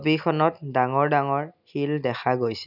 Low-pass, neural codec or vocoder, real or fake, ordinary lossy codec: 5.4 kHz; none; real; none